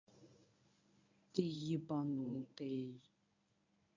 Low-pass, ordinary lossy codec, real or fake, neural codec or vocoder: 7.2 kHz; MP3, 64 kbps; fake; codec, 24 kHz, 0.9 kbps, WavTokenizer, medium speech release version 1